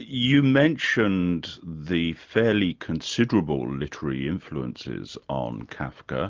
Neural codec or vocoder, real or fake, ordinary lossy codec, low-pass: none; real; Opus, 32 kbps; 7.2 kHz